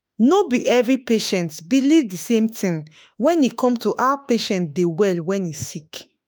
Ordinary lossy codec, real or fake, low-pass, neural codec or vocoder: none; fake; none; autoencoder, 48 kHz, 32 numbers a frame, DAC-VAE, trained on Japanese speech